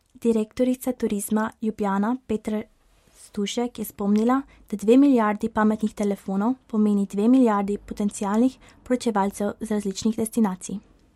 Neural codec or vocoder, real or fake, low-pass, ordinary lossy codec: none; real; 19.8 kHz; MP3, 64 kbps